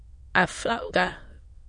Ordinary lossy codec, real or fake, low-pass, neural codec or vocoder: MP3, 48 kbps; fake; 9.9 kHz; autoencoder, 22.05 kHz, a latent of 192 numbers a frame, VITS, trained on many speakers